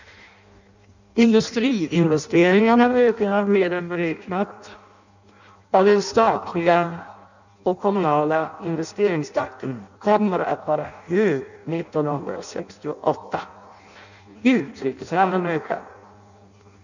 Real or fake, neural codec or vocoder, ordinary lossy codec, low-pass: fake; codec, 16 kHz in and 24 kHz out, 0.6 kbps, FireRedTTS-2 codec; none; 7.2 kHz